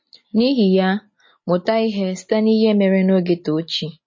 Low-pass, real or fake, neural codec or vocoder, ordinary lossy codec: 7.2 kHz; real; none; MP3, 32 kbps